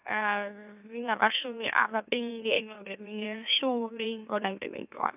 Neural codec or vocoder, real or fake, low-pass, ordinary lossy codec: autoencoder, 44.1 kHz, a latent of 192 numbers a frame, MeloTTS; fake; 3.6 kHz; none